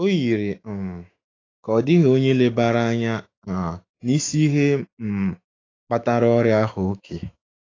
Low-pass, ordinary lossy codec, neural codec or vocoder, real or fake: 7.2 kHz; AAC, 32 kbps; autoencoder, 48 kHz, 128 numbers a frame, DAC-VAE, trained on Japanese speech; fake